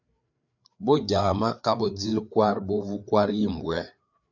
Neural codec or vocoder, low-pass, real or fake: codec, 16 kHz, 4 kbps, FreqCodec, larger model; 7.2 kHz; fake